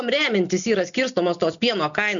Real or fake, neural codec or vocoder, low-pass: real; none; 7.2 kHz